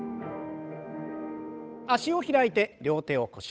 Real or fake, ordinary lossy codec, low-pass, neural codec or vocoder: fake; none; none; codec, 16 kHz, 8 kbps, FunCodec, trained on Chinese and English, 25 frames a second